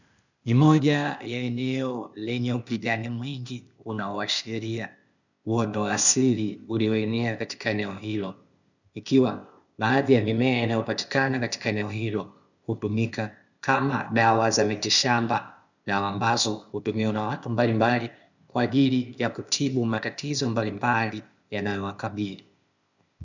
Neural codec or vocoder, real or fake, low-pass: codec, 16 kHz, 0.8 kbps, ZipCodec; fake; 7.2 kHz